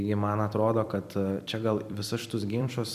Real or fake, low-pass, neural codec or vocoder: fake; 14.4 kHz; vocoder, 44.1 kHz, 128 mel bands every 256 samples, BigVGAN v2